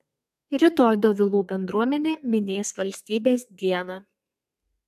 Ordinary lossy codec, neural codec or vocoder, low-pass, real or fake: AAC, 96 kbps; codec, 32 kHz, 1.9 kbps, SNAC; 14.4 kHz; fake